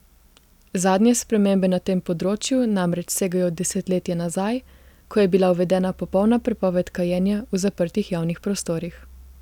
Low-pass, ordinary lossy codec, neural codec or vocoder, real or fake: 19.8 kHz; none; none; real